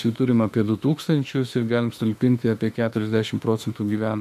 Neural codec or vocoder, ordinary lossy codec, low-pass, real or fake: autoencoder, 48 kHz, 32 numbers a frame, DAC-VAE, trained on Japanese speech; MP3, 96 kbps; 14.4 kHz; fake